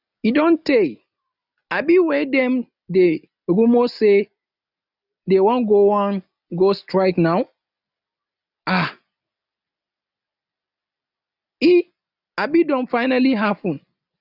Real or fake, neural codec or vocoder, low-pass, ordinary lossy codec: real; none; 5.4 kHz; none